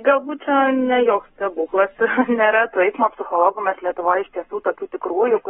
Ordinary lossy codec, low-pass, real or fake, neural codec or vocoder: AAC, 16 kbps; 19.8 kHz; fake; codec, 44.1 kHz, 7.8 kbps, Pupu-Codec